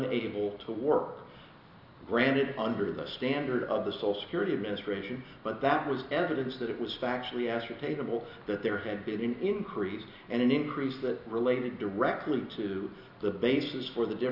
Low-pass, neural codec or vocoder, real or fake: 5.4 kHz; none; real